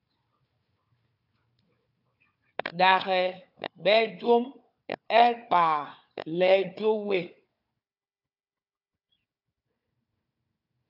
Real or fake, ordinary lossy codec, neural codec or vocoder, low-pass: fake; AAC, 48 kbps; codec, 16 kHz, 4 kbps, FunCodec, trained on Chinese and English, 50 frames a second; 5.4 kHz